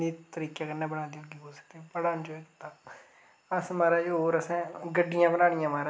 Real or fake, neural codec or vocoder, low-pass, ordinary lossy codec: real; none; none; none